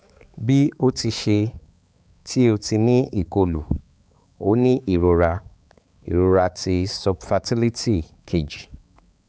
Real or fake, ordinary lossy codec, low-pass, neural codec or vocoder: fake; none; none; codec, 16 kHz, 4 kbps, X-Codec, HuBERT features, trained on balanced general audio